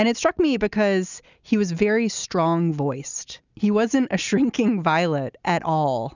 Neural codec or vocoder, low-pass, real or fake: none; 7.2 kHz; real